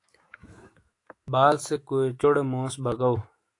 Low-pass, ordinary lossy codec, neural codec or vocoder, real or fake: 10.8 kHz; AAC, 48 kbps; autoencoder, 48 kHz, 128 numbers a frame, DAC-VAE, trained on Japanese speech; fake